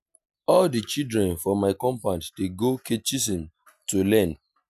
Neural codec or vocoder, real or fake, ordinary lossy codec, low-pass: none; real; none; 14.4 kHz